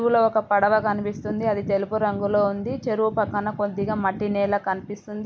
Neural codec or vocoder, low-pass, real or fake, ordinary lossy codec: none; none; real; none